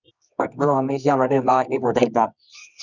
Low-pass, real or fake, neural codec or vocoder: 7.2 kHz; fake; codec, 24 kHz, 0.9 kbps, WavTokenizer, medium music audio release